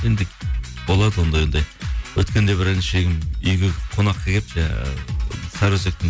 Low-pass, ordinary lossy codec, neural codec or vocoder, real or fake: none; none; none; real